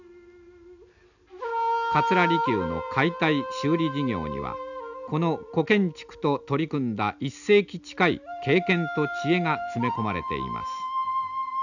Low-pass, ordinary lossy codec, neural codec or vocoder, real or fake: 7.2 kHz; none; none; real